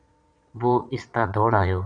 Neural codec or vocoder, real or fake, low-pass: vocoder, 22.05 kHz, 80 mel bands, Vocos; fake; 9.9 kHz